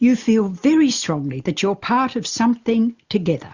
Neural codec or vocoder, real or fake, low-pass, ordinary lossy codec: none; real; 7.2 kHz; Opus, 64 kbps